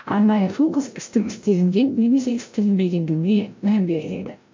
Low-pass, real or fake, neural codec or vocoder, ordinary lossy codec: 7.2 kHz; fake; codec, 16 kHz, 0.5 kbps, FreqCodec, larger model; MP3, 48 kbps